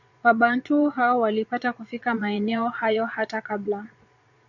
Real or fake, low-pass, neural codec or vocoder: fake; 7.2 kHz; vocoder, 22.05 kHz, 80 mel bands, Vocos